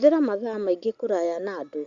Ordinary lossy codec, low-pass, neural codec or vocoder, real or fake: Opus, 64 kbps; 7.2 kHz; none; real